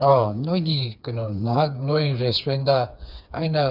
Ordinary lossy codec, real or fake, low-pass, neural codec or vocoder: none; fake; 5.4 kHz; codec, 16 kHz, 4 kbps, FreqCodec, smaller model